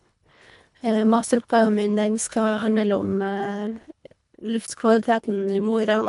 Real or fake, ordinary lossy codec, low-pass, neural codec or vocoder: fake; MP3, 96 kbps; 10.8 kHz; codec, 24 kHz, 1.5 kbps, HILCodec